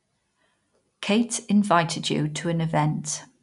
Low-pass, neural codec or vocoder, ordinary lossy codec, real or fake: 10.8 kHz; none; none; real